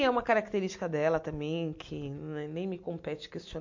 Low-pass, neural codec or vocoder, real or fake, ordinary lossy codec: 7.2 kHz; none; real; MP3, 48 kbps